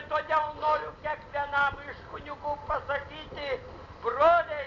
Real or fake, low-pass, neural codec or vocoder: real; 7.2 kHz; none